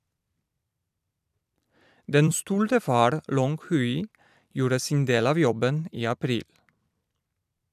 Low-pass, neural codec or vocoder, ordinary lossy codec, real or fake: 14.4 kHz; vocoder, 44.1 kHz, 128 mel bands every 256 samples, BigVGAN v2; none; fake